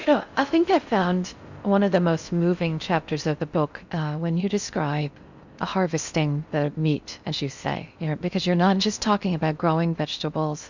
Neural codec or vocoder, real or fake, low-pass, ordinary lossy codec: codec, 16 kHz in and 24 kHz out, 0.6 kbps, FocalCodec, streaming, 4096 codes; fake; 7.2 kHz; Opus, 64 kbps